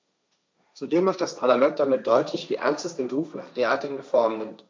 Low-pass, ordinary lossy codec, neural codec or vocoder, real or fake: none; none; codec, 16 kHz, 1.1 kbps, Voila-Tokenizer; fake